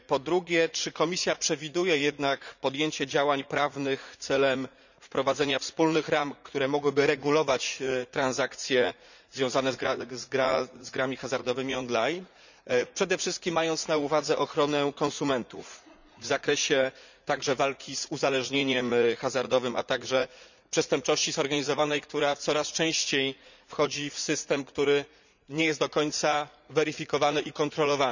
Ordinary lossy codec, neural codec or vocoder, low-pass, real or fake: none; vocoder, 44.1 kHz, 80 mel bands, Vocos; 7.2 kHz; fake